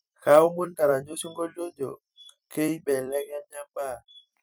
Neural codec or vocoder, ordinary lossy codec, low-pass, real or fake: vocoder, 44.1 kHz, 128 mel bands every 256 samples, BigVGAN v2; none; none; fake